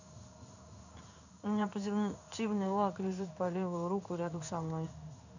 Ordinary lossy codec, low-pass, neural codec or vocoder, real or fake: none; 7.2 kHz; codec, 16 kHz in and 24 kHz out, 1 kbps, XY-Tokenizer; fake